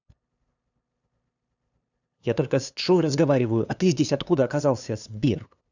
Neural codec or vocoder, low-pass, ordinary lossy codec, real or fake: codec, 16 kHz, 2 kbps, FunCodec, trained on LibriTTS, 25 frames a second; 7.2 kHz; none; fake